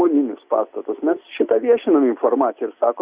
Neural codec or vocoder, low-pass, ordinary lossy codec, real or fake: none; 3.6 kHz; Opus, 64 kbps; real